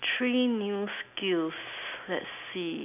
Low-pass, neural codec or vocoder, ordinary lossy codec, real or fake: 3.6 kHz; none; none; real